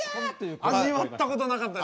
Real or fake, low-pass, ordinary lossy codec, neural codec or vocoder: real; none; none; none